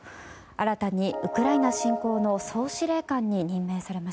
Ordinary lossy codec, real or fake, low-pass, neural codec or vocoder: none; real; none; none